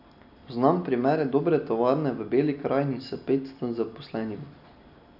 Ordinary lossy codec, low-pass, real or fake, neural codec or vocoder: none; 5.4 kHz; real; none